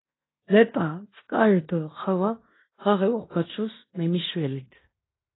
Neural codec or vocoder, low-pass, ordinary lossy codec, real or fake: codec, 16 kHz in and 24 kHz out, 0.9 kbps, LongCat-Audio-Codec, fine tuned four codebook decoder; 7.2 kHz; AAC, 16 kbps; fake